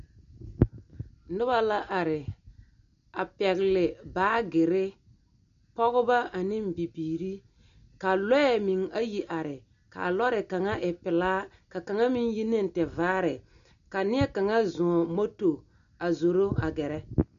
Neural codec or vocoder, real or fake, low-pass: none; real; 7.2 kHz